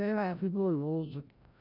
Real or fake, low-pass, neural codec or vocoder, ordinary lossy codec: fake; 5.4 kHz; codec, 16 kHz, 0.5 kbps, FreqCodec, larger model; none